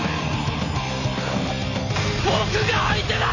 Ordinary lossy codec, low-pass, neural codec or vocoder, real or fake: none; 7.2 kHz; none; real